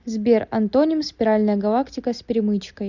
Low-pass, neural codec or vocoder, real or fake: 7.2 kHz; none; real